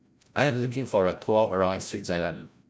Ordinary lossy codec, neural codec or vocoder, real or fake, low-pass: none; codec, 16 kHz, 0.5 kbps, FreqCodec, larger model; fake; none